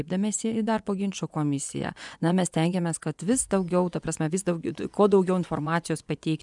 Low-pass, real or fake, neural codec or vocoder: 10.8 kHz; fake; vocoder, 24 kHz, 100 mel bands, Vocos